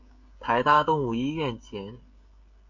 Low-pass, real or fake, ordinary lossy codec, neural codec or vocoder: 7.2 kHz; fake; MP3, 64 kbps; codec, 16 kHz, 16 kbps, FreqCodec, smaller model